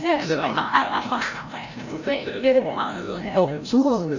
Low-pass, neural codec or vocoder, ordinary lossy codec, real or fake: 7.2 kHz; codec, 16 kHz, 0.5 kbps, FreqCodec, larger model; none; fake